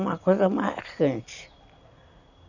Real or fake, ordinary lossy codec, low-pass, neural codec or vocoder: real; none; 7.2 kHz; none